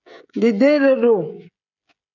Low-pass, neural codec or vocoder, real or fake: 7.2 kHz; codec, 16 kHz, 16 kbps, FreqCodec, smaller model; fake